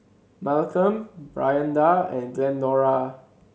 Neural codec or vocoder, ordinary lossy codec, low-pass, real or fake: none; none; none; real